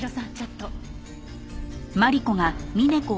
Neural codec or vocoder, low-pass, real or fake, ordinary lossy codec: none; none; real; none